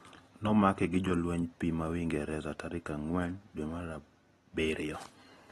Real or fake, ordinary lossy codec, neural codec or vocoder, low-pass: real; AAC, 32 kbps; none; 19.8 kHz